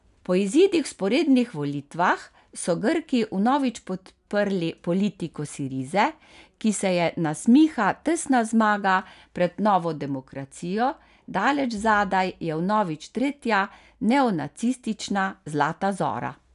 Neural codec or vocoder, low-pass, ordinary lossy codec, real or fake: none; 10.8 kHz; none; real